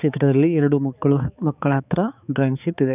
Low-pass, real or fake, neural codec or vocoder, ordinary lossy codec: 3.6 kHz; fake; codec, 16 kHz, 4 kbps, X-Codec, HuBERT features, trained on balanced general audio; none